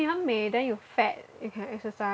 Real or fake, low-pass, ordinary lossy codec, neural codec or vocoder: real; none; none; none